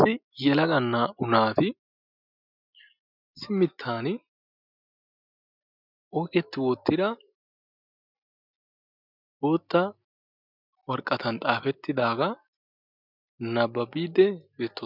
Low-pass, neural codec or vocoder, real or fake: 5.4 kHz; none; real